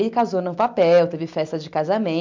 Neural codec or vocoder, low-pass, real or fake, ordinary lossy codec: none; 7.2 kHz; real; MP3, 64 kbps